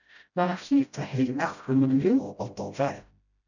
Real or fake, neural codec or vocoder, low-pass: fake; codec, 16 kHz, 0.5 kbps, FreqCodec, smaller model; 7.2 kHz